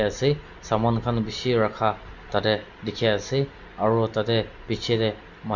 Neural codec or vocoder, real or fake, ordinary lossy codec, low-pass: none; real; none; 7.2 kHz